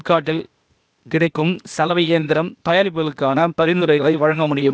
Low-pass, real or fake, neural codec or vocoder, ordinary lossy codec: none; fake; codec, 16 kHz, 0.8 kbps, ZipCodec; none